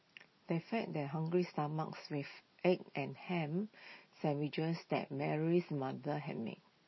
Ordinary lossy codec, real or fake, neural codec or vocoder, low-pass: MP3, 24 kbps; real; none; 7.2 kHz